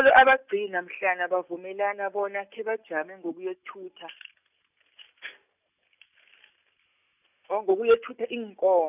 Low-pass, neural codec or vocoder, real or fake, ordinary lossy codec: 3.6 kHz; none; real; none